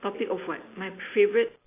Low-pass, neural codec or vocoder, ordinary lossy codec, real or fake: 3.6 kHz; none; AAC, 24 kbps; real